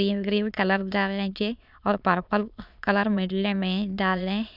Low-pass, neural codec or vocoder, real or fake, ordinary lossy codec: 5.4 kHz; autoencoder, 22.05 kHz, a latent of 192 numbers a frame, VITS, trained on many speakers; fake; none